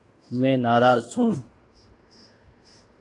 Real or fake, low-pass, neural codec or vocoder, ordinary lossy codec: fake; 10.8 kHz; codec, 16 kHz in and 24 kHz out, 0.9 kbps, LongCat-Audio-Codec, fine tuned four codebook decoder; AAC, 48 kbps